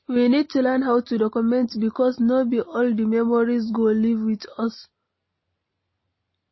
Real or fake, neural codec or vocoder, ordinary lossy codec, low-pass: real; none; MP3, 24 kbps; 7.2 kHz